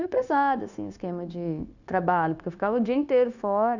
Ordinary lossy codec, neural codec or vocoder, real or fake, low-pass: none; codec, 16 kHz, 0.9 kbps, LongCat-Audio-Codec; fake; 7.2 kHz